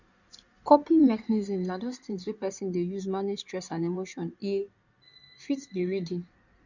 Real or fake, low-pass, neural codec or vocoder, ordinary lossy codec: fake; 7.2 kHz; codec, 16 kHz in and 24 kHz out, 2.2 kbps, FireRedTTS-2 codec; none